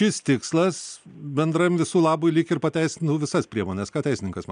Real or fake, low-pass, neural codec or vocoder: real; 9.9 kHz; none